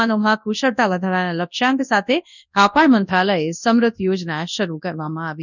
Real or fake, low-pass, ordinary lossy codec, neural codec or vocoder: fake; 7.2 kHz; none; codec, 24 kHz, 0.9 kbps, WavTokenizer, large speech release